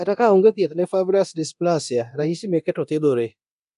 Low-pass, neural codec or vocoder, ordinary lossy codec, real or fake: 10.8 kHz; codec, 24 kHz, 0.9 kbps, DualCodec; none; fake